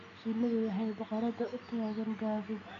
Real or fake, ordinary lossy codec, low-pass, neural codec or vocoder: real; AAC, 64 kbps; 7.2 kHz; none